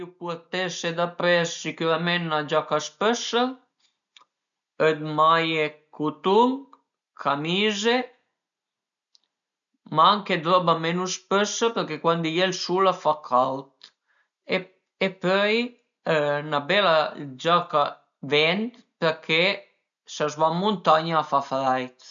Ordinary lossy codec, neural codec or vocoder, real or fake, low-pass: none; none; real; 7.2 kHz